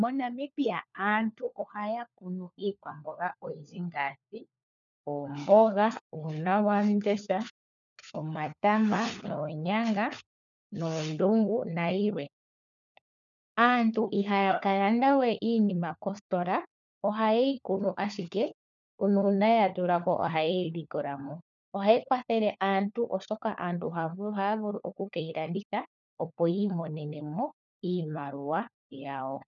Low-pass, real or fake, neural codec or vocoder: 7.2 kHz; fake; codec, 16 kHz, 4 kbps, FunCodec, trained on LibriTTS, 50 frames a second